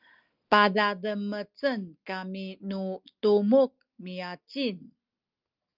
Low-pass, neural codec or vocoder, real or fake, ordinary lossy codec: 5.4 kHz; none; real; Opus, 24 kbps